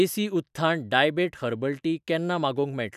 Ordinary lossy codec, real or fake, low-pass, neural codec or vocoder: none; real; 14.4 kHz; none